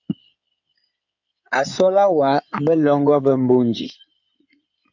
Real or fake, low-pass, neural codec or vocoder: fake; 7.2 kHz; codec, 16 kHz in and 24 kHz out, 2.2 kbps, FireRedTTS-2 codec